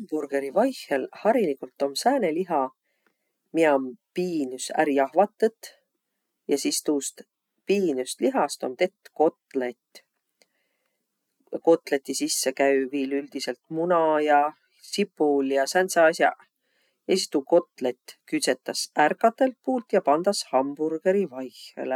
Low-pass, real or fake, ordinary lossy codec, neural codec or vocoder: 19.8 kHz; real; none; none